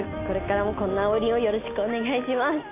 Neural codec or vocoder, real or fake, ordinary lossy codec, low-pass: none; real; AAC, 24 kbps; 3.6 kHz